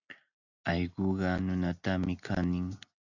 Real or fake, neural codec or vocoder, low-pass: real; none; 7.2 kHz